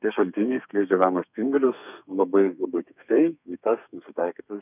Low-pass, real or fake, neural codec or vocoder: 3.6 kHz; fake; codec, 32 kHz, 1.9 kbps, SNAC